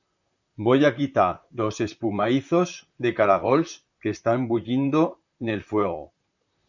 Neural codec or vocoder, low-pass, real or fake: vocoder, 44.1 kHz, 128 mel bands, Pupu-Vocoder; 7.2 kHz; fake